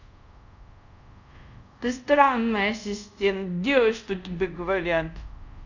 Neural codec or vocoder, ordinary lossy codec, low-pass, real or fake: codec, 24 kHz, 0.5 kbps, DualCodec; none; 7.2 kHz; fake